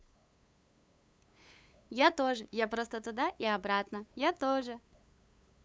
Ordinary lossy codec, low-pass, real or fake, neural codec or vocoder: none; none; fake; codec, 16 kHz, 8 kbps, FunCodec, trained on LibriTTS, 25 frames a second